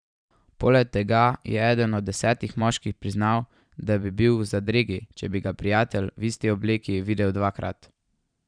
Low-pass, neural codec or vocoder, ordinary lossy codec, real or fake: 9.9 kHz; vocoder, 44.1 kHz, 128 mel bands every 512 samples, BigVGAN v2; none; fake